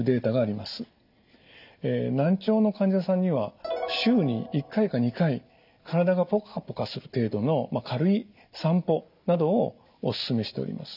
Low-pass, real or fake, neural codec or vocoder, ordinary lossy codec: 5.4 kHz; real; none; none